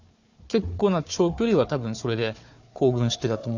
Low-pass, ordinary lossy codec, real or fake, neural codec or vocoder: 7.2 kHz; none; fake; codec, 16 kHz, 4 kbps, FunCodec, trained on Chinese and English, 50 frames a second